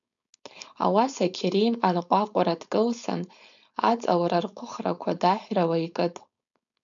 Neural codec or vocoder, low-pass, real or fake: codec, 16 kHz, 4.8 kbps, FACodec; 7.2 kHz; fake